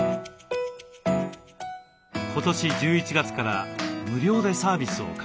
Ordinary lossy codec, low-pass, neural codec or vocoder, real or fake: none; none; none; real